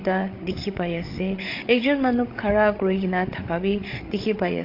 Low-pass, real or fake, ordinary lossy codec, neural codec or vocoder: 5.4 kHz; fake; AAC, 32 kbps; codec, 16 kHz, 8 kbps, FreqCodec, larger model